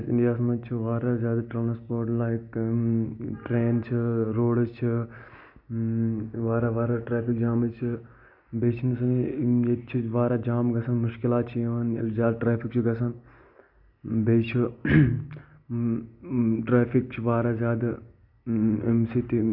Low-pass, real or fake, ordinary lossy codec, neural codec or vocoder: 5.4 kHz; real; none; none